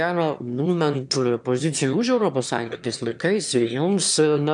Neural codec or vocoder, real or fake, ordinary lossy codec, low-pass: autoencoder, 22.05 kHz, a latent of 192 numbers a frame, VITS, trained on one speaker; fake; MP3, 96 kbps; 9.9 kHz